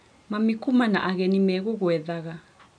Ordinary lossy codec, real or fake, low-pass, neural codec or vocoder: none; real; 9.9 kHz; none